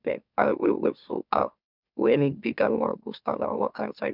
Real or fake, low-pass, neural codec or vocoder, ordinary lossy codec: fake; 5.4 kHz; autoencoder, 44.1 kHz, a latent of 192 numbers a frame, MeloTTS; none